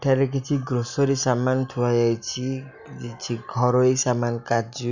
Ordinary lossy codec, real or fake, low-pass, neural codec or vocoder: none; real; 7.2 kHz; none